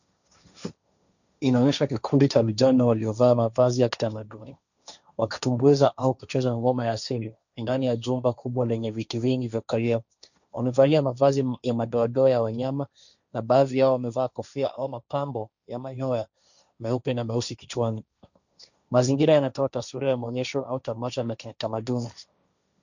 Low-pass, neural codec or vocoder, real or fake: 7.2 kHz; codec, 16 kHz, 1.1 kbps, Voila-Tokenizer; fake